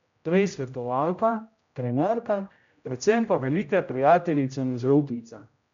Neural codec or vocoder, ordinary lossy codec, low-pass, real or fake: codec, 16 kHz, 0.5 kbps, X-Codec, HuBERT features, trained on general audio; MP3, 64 kbps; 7.2 kHz; fake